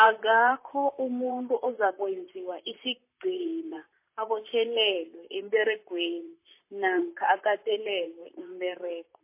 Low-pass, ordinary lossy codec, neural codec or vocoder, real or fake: 3.6 kHz; MP3, 24 kbps; vocoder, 44.1 kHz, 128 mel bands, Pupu-Vocoder; fake